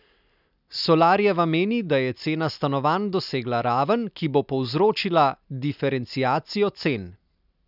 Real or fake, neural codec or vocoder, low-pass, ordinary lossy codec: real; none; 5.4 kHz; none